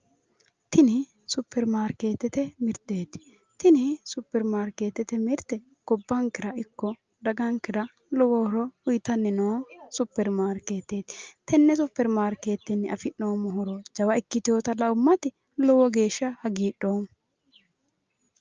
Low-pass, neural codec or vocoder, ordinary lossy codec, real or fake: 7.2 kHz; none; Opus, 24 kbps; real